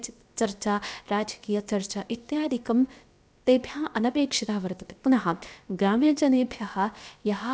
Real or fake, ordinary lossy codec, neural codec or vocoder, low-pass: fake; none; codec, 16 kHz, about 1 kbps, DyCAST, with the encoder's durations; none